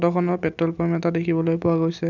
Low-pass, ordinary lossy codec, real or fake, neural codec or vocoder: 7.2 kHz; none; real; none